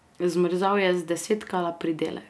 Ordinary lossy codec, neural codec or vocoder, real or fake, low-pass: none; none; real; none